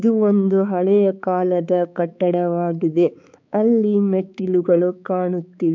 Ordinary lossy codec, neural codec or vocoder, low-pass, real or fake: MP3, 64 kbps; codec, 16 kHz, 4 kbps, X-Codec, HuBERT features, trained on balanced general audio; 7.2 kHz; fake